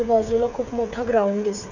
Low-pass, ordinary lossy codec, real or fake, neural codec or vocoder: 7.2 kHz; none; fake; codec, 16 kHz, 8 kbps, FreqCodec, smaller model